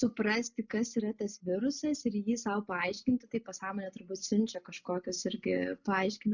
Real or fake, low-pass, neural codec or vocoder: real; 7.2 kHz; none